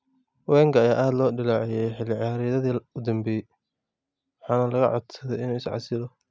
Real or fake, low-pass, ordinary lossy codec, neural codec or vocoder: real; none; none; none